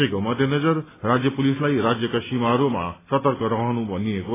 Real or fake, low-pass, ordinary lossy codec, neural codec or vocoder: real; 3.6 kHz; AAC, 16 kbps; none